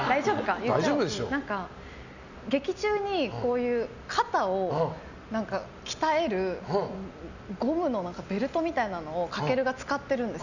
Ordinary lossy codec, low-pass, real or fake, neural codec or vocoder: none; 7.2 kHz; real; none